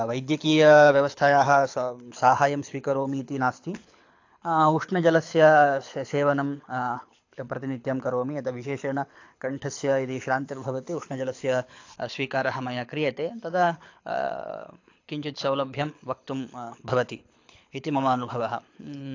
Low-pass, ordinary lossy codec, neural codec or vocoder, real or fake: 7.2 kHz; AAC, 48 kbps; codec, 24 kHz, 6 kbps, HILCodec; fake